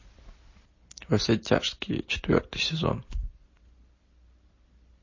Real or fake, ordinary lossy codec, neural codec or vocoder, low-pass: real; MP3, 32 kbps; none; 7.2 kHz